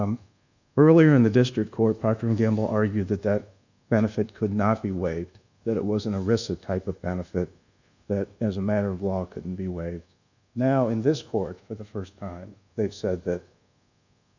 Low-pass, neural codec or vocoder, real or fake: 7.2 kHz; codec, 24 kHz, 1.2 kbps, DualCodec; fake